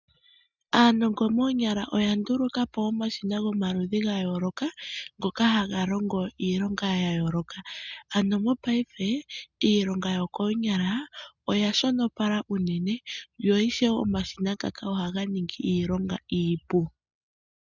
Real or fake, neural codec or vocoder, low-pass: real; none; 7.2 kHz